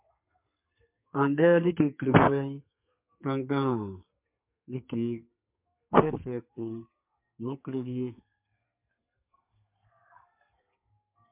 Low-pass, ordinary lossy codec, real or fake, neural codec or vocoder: 3.6 kHz; MP3, 32 kbps; fake; codec, 32 kHz, 1.9 kbps, SNAC